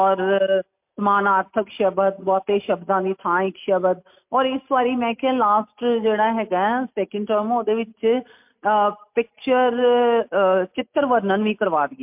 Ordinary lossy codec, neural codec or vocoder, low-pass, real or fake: MP3, 32 kbps; none; 3.6 kHz; real